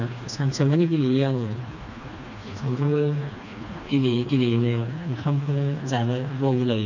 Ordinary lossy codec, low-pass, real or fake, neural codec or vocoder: none; 7.2 kHz; fake; codec, 16 kHz, 2 kbps, FreqCodec, smaller model